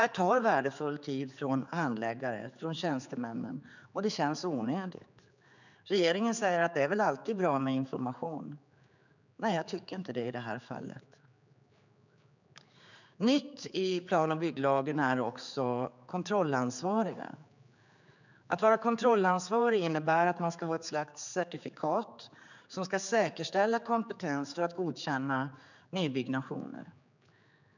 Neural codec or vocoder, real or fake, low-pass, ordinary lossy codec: codec, 16 kHz, 4 kbps, X-Codec, HuBERT features, trained on general audio; fake; 7.2 kHz; none